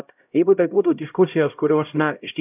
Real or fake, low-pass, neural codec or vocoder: fake; 3.6 kHz; codec, 16 kHz, 0.5 kbps, X-Codec, HuBERT features, trained on LibriSpeech